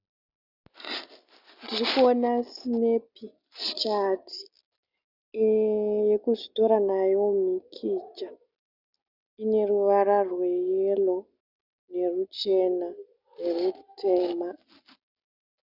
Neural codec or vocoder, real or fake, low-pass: none; real; 5.4 kHz